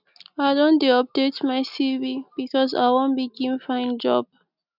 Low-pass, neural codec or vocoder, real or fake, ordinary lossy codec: 5.4 kHz; none; real; none